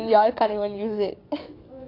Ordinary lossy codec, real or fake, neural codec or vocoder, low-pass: AAC, 32 kbps; fake; codec, 44.1 kHz, 7.8 kbps, DAC; 5.4 kHz